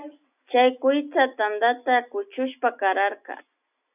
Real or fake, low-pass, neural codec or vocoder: real; 3.6 kHz; none